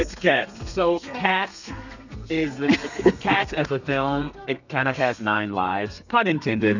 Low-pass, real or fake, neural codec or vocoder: 7.2 kHz; fake; codec, 32 kHz, 1.9 kbps, SNAC